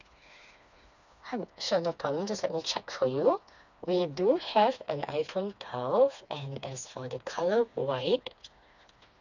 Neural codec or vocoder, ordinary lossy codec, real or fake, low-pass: codec, 16 kHz, 2 kbps, FreqCodec, smaller model; none; fake; 7.2 kHz